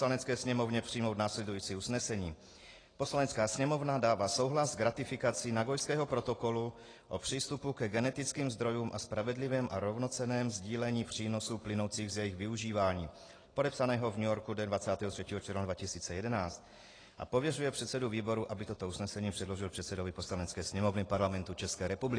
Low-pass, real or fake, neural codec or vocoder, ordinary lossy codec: 9.9 kHz; real; none; AAC, 32 kbps